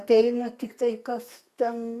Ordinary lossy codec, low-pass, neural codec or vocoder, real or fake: Opus, 64 kbps; 14.4 kHz; codec, 32 kHz, 1.9 kbps, SNAC; fake